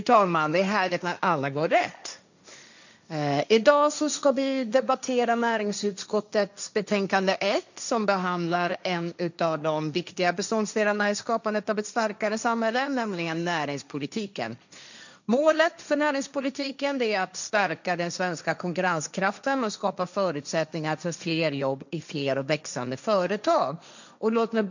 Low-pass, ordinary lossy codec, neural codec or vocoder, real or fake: 7.2 kHz; none; codec, 16 kHz, 1.1 kbps, Voila-Tokenizer; fake